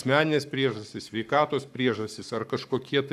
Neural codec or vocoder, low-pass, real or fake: codec, 44.1 kHz, 7.8 kbps, Pupu-Codec; 14.4 kHz; fake